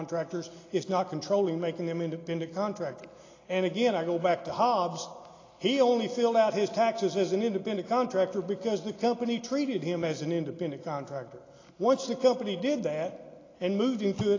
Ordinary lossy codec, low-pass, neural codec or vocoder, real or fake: AAC, 32 kbps; 7.2 kHz; none; real